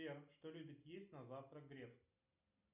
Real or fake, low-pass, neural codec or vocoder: real; 3.6 kHz; none